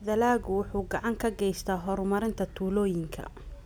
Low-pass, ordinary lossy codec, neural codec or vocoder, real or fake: none; none; none; real